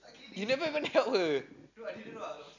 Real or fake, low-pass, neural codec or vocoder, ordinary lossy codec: real; 7.2 kHz; none; none